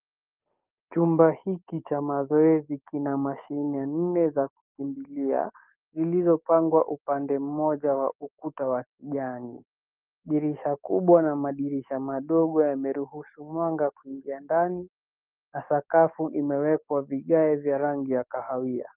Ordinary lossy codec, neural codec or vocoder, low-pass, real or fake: Opus, 32 kbps; codec, 44.1 kHz, 7.8 kbps, DAC; 3.6 kHz; fake